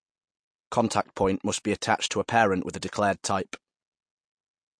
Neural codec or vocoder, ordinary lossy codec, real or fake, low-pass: none; MP3, 48 kbps; real; 9.9 kHz